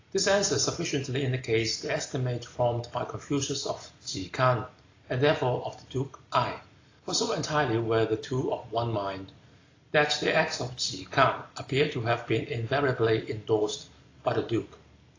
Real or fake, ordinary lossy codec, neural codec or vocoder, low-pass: real; AAC, 32 kbps; none; 7.2 kHz